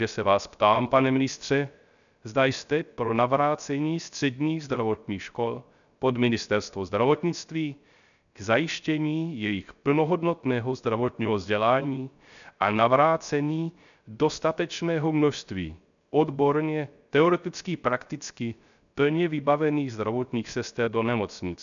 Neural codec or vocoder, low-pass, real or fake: codec, 16 kHz, 0.3 kbps, FocalCodec; 7.2 kHz; fake